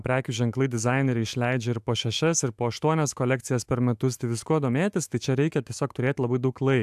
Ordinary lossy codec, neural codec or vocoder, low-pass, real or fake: AAC, 96 kbps; autoencoder, 48 kHz, 128 numbers a frame, DAC-VAE, trained on Japanese speech; 14.4 kHz; fake